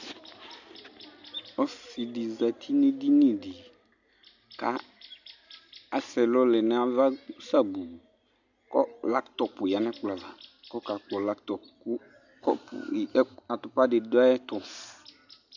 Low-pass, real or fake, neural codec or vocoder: 7.2 kHz; real; none